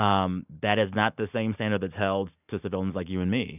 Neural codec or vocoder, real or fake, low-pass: none; real; 3.6 kHz